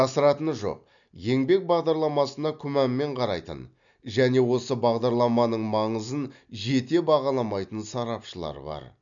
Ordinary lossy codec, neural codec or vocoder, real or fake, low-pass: none; none; real; 7.2 kHz